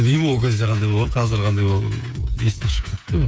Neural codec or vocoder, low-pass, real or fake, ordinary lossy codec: codec, 16 kHz, 8 kbps, FreqCodec, smaller model; none; fake; none